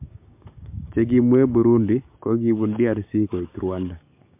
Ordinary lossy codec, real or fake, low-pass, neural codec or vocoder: MP3, 32 kbps; fake; 3.6 kHz; codec, 16 kHz, 6 kbps, DAC